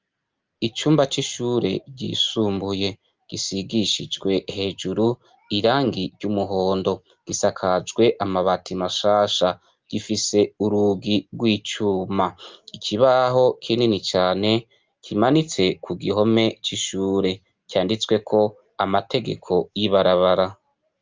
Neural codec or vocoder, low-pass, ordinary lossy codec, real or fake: none; 7.2 kHz; Opus, 24 kbps; real